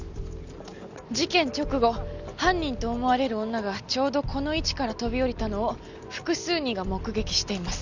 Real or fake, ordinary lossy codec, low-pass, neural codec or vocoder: real; none; 7.2 kHz; none